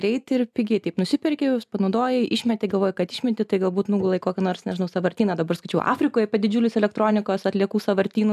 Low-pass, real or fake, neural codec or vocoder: 14.4 kHz; real; none